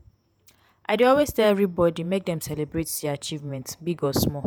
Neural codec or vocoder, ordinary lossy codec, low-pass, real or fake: vocoder, 48 kHz, 128 mel bands, Vocos; none; none; fake